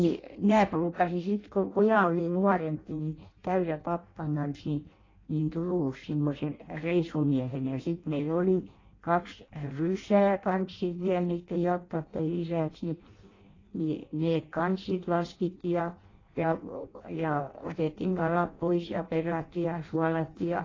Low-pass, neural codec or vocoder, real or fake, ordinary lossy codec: 7.2 kHz; codec, 16 kHz in and 24 kHz out, 0.6 kbps, FireRedTTS-2 codec; fake; AAC, 32 kbps